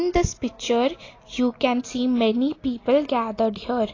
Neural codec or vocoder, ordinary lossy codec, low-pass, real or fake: none; AAC, 32 kbps; 7.2 kHz; real